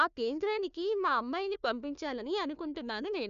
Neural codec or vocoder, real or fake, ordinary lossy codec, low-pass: codec, 16 kHz, 1 kbps, FunCodec, trained on Chinese and English, 50 frames a second; fake; none; 7.2 kHz